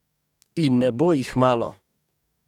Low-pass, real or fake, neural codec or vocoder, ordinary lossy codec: 19.8 kHz; fake; codec, 44.1 kHz, 2.6 kbps, DAC; none